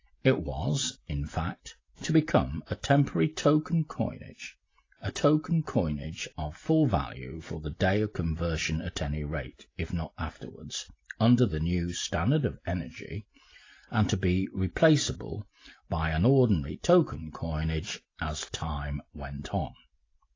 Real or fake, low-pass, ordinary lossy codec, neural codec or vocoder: real; 7.2 kHz; AAC, 32 kbps; none